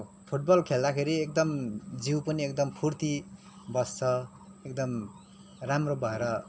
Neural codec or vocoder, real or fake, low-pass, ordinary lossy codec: none; real; none; none